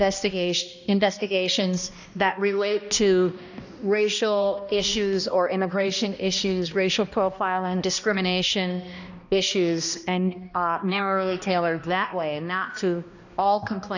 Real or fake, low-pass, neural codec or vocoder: fake; 7.2 kHz; codec, 16 kHz, 1 kbps, X-Codec, HuBERT features, trained on balanced general audio